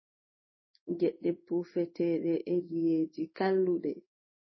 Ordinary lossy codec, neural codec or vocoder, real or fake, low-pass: MP3, 24 kbps; codec, 16 kHz in and 24 kHz out, 1 kbps, XY-Tokenizer; fake; 7.2 kHz